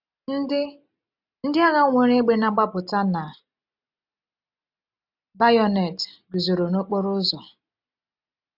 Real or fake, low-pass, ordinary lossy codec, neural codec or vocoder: real; 5.4 kHz; none; none